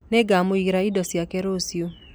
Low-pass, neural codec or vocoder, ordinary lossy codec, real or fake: none; none; none; real